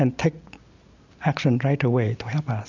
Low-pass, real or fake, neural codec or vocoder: 7.2 kHz; real; none